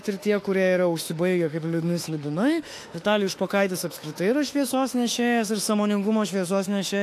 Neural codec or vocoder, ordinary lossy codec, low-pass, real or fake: autoencoder, 48 kHz, 32 numbers a frame, DAC-VAE, trained on Japanese speech; AAC, 64 kbps; 14.4 kHz; fake